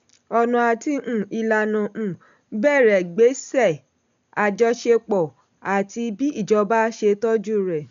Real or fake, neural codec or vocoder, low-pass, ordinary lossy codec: real; none; 7.2 kHz; none